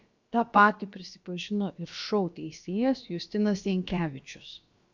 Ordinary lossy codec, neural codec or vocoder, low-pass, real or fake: MP3, 64 kbps; codec, 16 kHz, about 1 kbps, DyCAST, with the encoder's durations; 7.2 kHz; fake